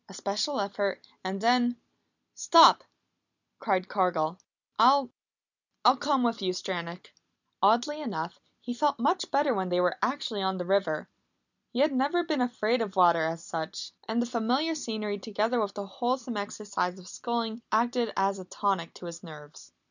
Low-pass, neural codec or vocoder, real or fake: 7.2 kHz; none; real